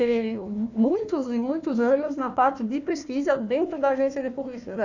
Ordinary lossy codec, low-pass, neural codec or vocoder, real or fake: none; 7.2 kHz; codec, 16 kHz, 1 kbps, FunCodec, trained on Chinese and English, 50 frames a second; fake